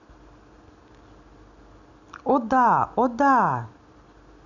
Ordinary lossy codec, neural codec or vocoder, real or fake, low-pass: none; none; real; 7.2 kHz